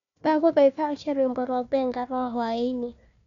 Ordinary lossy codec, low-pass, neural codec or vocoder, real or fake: none; 7.2 kHz; codec, 16 kHz, 1 kbps, FunCodec, trained on Chinese and English, 50 frames a second; fake